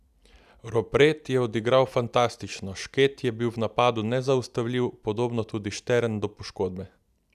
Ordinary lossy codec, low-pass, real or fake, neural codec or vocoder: none; 14.4 kHz; real; none